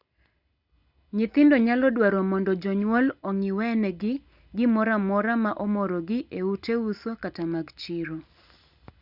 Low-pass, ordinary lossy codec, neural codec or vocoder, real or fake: 5.4 kHz; Opus, 64 kbps; none; real